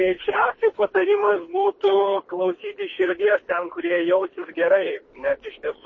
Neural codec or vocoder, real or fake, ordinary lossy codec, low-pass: codec, 24 kHz, 3 kbps, HILCodec; fake; MP3, 32 kbps; 7.2 kHz